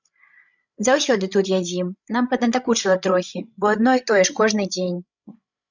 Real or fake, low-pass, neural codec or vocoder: fake; 7.2 kHz; codec, 16 kHz, 16 kbps, FreqCodec, larger model